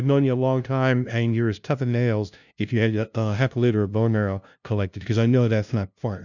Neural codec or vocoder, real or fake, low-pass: codec, 16 kHz, 0.5 kbps, FunCodec, trained on LibriTTS, 25 frames a second; fake; 7.2 kHz